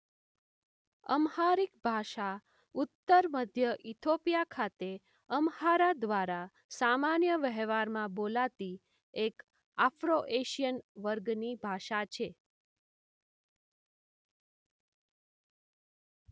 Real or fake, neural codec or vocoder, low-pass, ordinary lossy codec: real; none; none; none